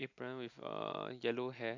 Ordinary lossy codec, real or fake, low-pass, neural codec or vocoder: AAC, 48 kbps; real; 7.2 kHz; none